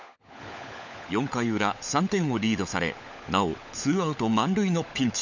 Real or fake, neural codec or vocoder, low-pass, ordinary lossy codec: fake; codec, 16 kHz, 16 kbps, FunCodec, trained on LibriTTS, 50 frames a second; 7.2 kHz; none